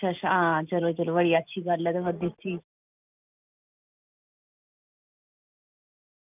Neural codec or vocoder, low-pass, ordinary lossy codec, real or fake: none; 3.6 kHz; none; real